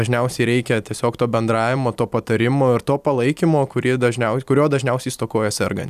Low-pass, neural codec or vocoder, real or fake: 14.4 kHz; vocoder, 44.1 kHz, 128 mel bands every 256 samples, BigVGAN v2; fake